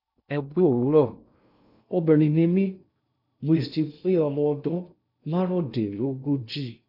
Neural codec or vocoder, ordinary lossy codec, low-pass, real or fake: codec, 16 kHz in and 24 kHz out, 0.6 kbps, FocalCodec, streaming, 2048 codes; none; 5.4 kHz; fake